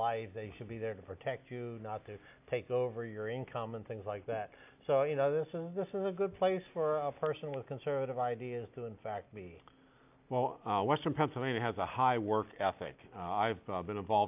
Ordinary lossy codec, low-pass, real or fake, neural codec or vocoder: AAC, 32 kbps; 3.6 kHz; real; none